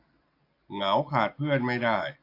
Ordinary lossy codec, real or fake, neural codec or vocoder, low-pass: AAC, 32 kbps; real; none; 5.4 kHz